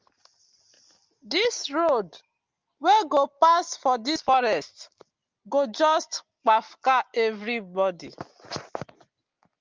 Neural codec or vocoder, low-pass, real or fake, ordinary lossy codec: none; 7.2 kHz; real; Opus, 32 kbps